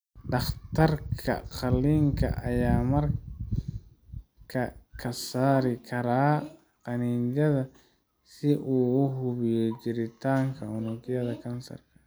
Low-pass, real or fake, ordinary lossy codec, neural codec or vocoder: none; real; none; none